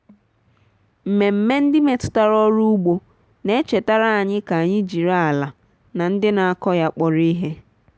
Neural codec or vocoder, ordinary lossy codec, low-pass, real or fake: none; none; none; real